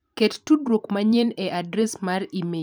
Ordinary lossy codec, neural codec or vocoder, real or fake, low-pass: none; vocoder, 44.1 kHz, 128 mel bands every 512 samples, BigVGAN v2; fake; none